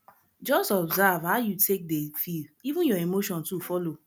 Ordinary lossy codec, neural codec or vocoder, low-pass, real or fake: none; none; none; real